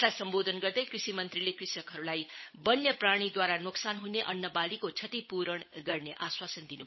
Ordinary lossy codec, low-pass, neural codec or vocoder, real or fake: MP3, 24 kbps; 7.2 kHz; codec, 16 kHz, 8 kbps, FunCodec, trained on Chinese and English, 25 frames a second; fake